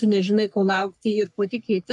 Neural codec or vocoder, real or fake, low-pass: codec, 44.1 kHz, 3.4 kbps, Pupu-Codec; fake; 10.8 kHz